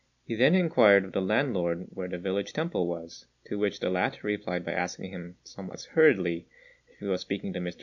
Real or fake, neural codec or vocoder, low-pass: real; none; 7.2 kHz